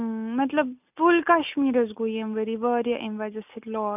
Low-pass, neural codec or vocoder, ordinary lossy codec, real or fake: 3.6 kHz; none; none; real